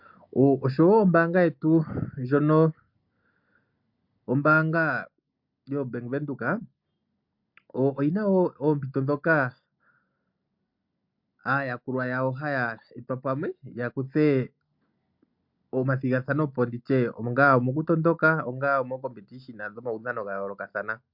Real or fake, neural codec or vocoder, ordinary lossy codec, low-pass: real; none; MP3, 48 kbps; 5.4 kHz